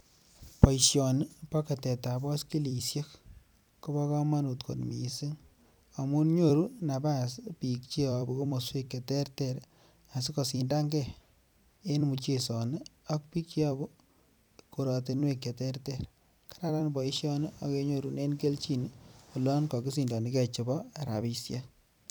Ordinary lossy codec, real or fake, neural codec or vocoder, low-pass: none; fake; vocoder, 44.1 kHz, 128 mel bands every 256 samples, BigVGAN v2; none